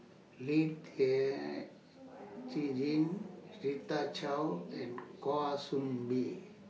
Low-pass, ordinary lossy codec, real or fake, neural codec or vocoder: none; none; real; none